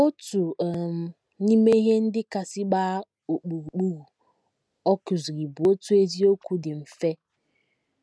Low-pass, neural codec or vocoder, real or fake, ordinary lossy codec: none; none; real; none